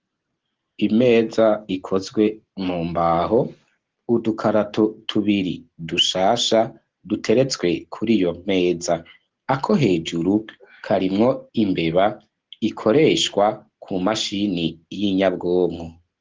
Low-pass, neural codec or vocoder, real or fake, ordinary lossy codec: 7.2 kHz; none; real; Opus, 16 kbps